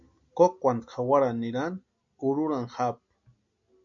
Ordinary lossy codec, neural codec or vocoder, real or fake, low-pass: MP3, 64 kbps; none; real; 7.2 kHz